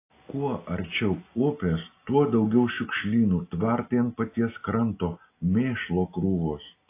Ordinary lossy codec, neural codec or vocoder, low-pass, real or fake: AAC, 24 kbps; none; 3.6 kHz; real